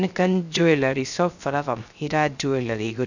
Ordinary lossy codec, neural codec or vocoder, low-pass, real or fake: none; codec, 16 kHz, 0.3 kbps, FocalCodec; 7.2 kHz; fake